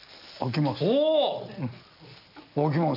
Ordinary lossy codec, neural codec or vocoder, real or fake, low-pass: none; none; real; 5.4 kHz